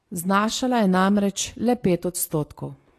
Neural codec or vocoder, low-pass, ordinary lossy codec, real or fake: none; 14.4 kHz; AAC, 48 kbps; real